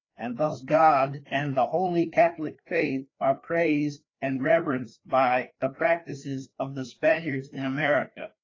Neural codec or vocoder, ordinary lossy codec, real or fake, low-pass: codec, 16 kHz, 2 kbps, FreqCodec, larger model; AAC, 32 kbps; fake; 7.2 kHz